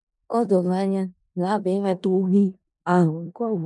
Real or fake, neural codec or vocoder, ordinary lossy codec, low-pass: fake; codec, 16 kHz in and 24 kHz out, 0.4 kbps, LongCat-Audio-Codec, four codebook decoder; none; 10.8 kHz